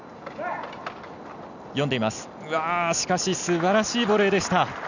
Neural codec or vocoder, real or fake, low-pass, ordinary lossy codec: none; real; 7.2 kHz; none